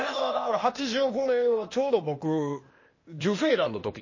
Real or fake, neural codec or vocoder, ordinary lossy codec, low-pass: fake; codec, 16 kHz, 0.8 kbps, ZipCodec; MP3, 32 kbps; 7.2 kHz